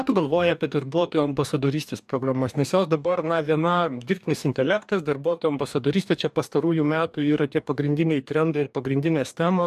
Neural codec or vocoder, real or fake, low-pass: codec, 44.1 kHz, 2.6 kbps, DAC; fake; 14.4 kHz